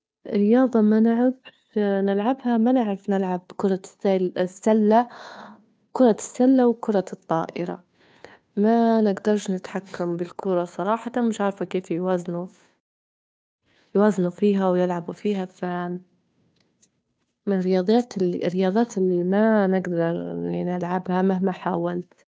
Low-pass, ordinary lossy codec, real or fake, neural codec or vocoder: none; none; fake; codec, 16 kHz, 2 kbps, FunCodec, trained on Chinese and English, 25 frames a second